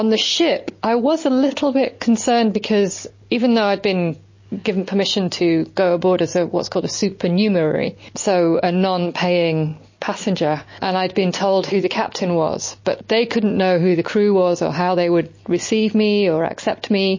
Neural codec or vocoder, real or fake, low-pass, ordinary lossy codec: none; real; 7.2 kHz; MP3, 32 kbps